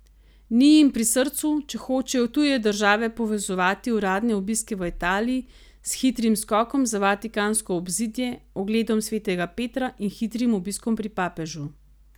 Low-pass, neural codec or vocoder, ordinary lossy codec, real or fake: none; none; none; real